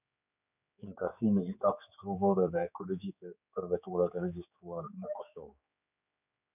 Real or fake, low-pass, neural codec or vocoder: fake; 3.6 kHz; codec, 16 kHz, 4 kbps, X-Codec, HuBERT features, trained on general audio